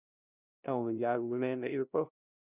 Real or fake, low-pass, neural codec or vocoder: fake; 3.6 kHz; codec, 16 kHz, 0.5 kbps, FunCodec, trained on LibriTTS, 25 frames a second